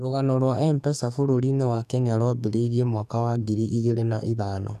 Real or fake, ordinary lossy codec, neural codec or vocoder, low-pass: fake; none; codec, 44.1 kHz, 2.6 kbps, SNAC; 14.4 kHz